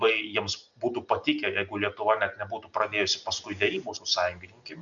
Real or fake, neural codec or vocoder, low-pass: real; none; 7.2 kHz